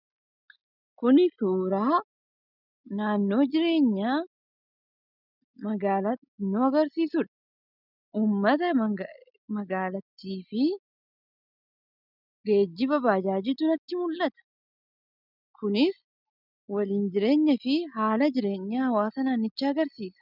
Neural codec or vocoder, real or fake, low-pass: none; real; 5.4 kHz